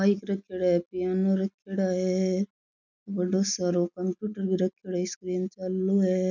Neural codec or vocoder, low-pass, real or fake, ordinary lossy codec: none; 7.2 kHz; real; none